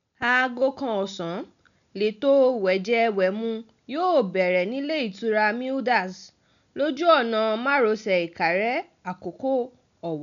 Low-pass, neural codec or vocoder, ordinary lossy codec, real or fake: 7.2 kHz; none; none; real